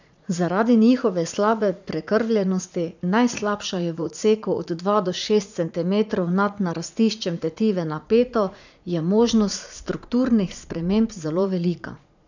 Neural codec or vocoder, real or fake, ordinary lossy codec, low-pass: vocoder, 44.1 kHz, 80 mel bands, Vocos; fake; none; 7.2 kHz